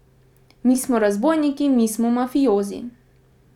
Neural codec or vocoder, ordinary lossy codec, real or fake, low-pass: none; none; real; 19.8 kHz